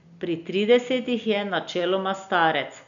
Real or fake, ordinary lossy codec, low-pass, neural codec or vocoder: real; none; 7.2 kHz; none